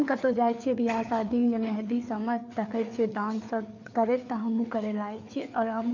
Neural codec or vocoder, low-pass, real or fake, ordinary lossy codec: codec, 16 kHz, 4 kbps, FreqCodec, larger model; 7.2 kHz; fake; none